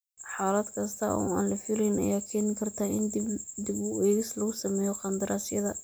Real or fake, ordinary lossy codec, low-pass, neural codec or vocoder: real; none; none; none